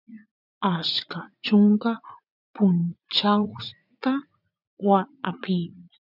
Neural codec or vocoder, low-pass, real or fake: vocoder, 44.1 kHz, 80 mel bands, Vocos; 5.4 kHz; fake